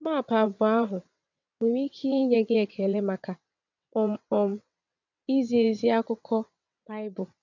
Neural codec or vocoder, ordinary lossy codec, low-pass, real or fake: vocoder, 22.05 kHz, 80 mel bands, WaveNeXt; none; 7.2 kHz; fake